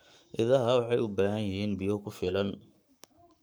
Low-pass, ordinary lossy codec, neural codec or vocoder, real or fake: none; none; codec, 44.1 kHz, 7.8 kbps, Pupu-Codec; fake